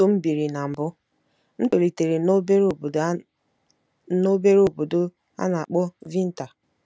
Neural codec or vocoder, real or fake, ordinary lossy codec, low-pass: none; real; none; none